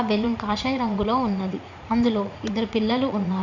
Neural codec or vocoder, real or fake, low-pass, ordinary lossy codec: vocoder, 44.1 kHz, 80 mel bands, Vocos; fake; 7.2 kHz; none